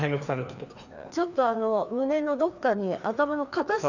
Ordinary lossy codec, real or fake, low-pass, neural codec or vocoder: none; fake; 7.2 kHz; codec, 16 kHz, 2 kbps, FreqCodec, larger model